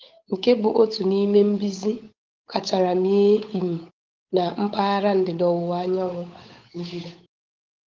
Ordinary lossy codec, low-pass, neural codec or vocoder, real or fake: Opus, 24 kbps; 7.2 kHz; codec, 16 kHz, 8 kbps, FunCodec, trained on Chinese and English, 25 frames a second; fake